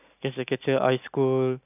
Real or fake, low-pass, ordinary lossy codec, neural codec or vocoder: real; 3.6 kHz; none; none